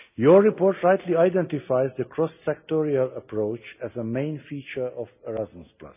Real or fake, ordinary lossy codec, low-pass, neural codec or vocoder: real; none; 3.6 kHz; none